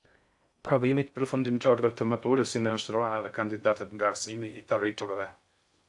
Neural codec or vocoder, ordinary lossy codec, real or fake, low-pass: codec, 16 kHz in and 24 kHz out, 0.6 kbps, FocalCodec, streaming, 4096 codes; AAC, 64 kbps; fake; 10.8 kHz